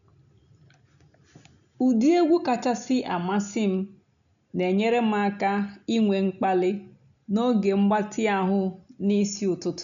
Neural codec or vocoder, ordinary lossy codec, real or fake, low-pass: none; none; real; 7.2 kHz